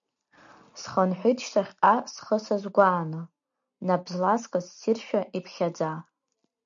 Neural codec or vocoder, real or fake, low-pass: none; real; 7.2 kHz